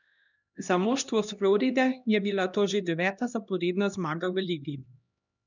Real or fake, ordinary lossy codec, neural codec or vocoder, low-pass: fake; none; codec, 16 kHz, 1 kbps, X-Codec, HuBERT features, trained on LibriSpeech; 7.2 kHz